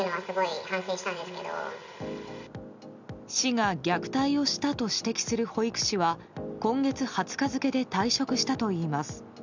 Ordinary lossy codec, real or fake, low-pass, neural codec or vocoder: none; real; 7.2 kHz; none